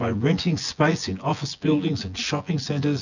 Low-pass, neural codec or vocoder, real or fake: 7.2 kHz; vocoder, 24 kHz, 100 mel bands, Vocos; fake